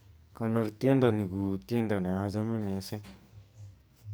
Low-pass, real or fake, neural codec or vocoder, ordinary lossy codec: none; fake; codec, 44.1 kHz, 2.6 kbps, SNAC; none